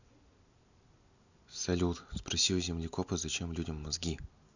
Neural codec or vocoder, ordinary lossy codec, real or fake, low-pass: none; none; real; 7.2 kHz